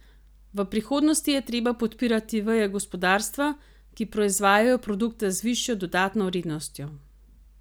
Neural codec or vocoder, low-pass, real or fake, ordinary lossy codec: none; none; real; none